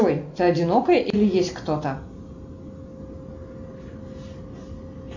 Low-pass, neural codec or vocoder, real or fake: 7.2 kHz; none; real